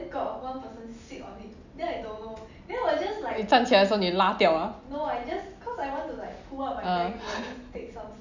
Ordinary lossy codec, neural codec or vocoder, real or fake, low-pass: none; none; real; 7.2 kHz